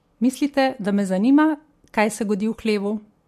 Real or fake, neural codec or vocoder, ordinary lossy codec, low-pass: real; none; MP3, 64 kbps; 14.4 kHz